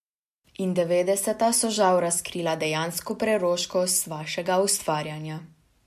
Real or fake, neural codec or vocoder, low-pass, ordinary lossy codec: real; none; 14.4 kHz; MP3, 64 kbps